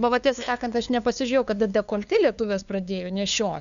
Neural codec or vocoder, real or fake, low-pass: codec, 16 kHz, 2 kbps, FunCodec, trained on LibriTTS, 25 frames a second; fake; 7.2 kHz